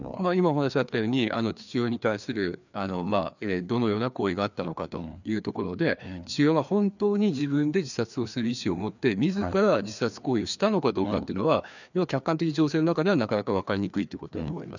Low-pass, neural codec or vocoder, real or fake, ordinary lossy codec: 7.2 kHz; codec, 16 kHz, 2 kbps, FreqCodec, larger model; fake; none